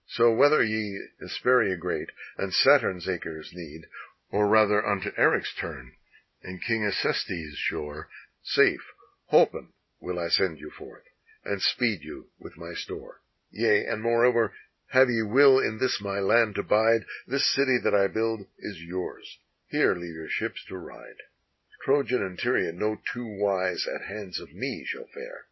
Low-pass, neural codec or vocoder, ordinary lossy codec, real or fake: 7.2 kHz; none; MP3, 24 kbps; real